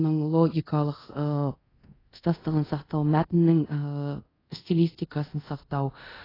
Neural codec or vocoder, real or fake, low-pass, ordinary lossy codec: codec, 24 kHz, 0.5 kbps, DualCodec; fake; 5.4 kHz; AAC, 24 kbps